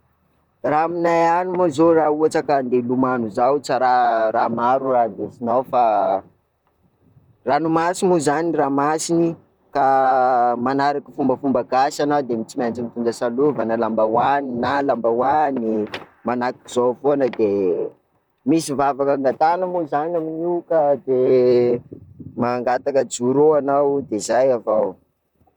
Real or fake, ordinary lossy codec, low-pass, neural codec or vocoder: fake; none; 19.8 kHz; vocoder, 44.1 kHz, 128 mel bands, Pupu-Vocoder